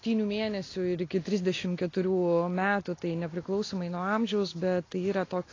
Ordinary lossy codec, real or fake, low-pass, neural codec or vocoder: AAC, 32 kbps; real; 7.2 kHz; none